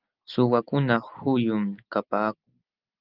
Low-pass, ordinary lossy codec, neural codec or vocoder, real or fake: 5.4 kHz; Opus, 32 kbps; none; real